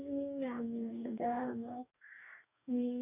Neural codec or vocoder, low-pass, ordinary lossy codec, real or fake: codec, 44.1 kHz, 2.6 kbps, DAC; 3.6 kHz; none; fake